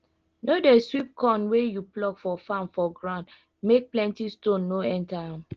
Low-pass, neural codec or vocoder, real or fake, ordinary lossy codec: 7.2 kHz; none; real; Opus, 16 kbps